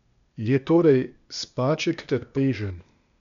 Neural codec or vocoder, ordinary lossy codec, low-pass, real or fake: codec, 16 kHz, 0.8 kbps, ZipCodec; none; 7.2 kHz; fake